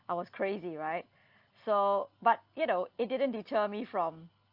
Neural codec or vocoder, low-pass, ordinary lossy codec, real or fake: none; 5.4 kHz; Opus, 32 kbps; real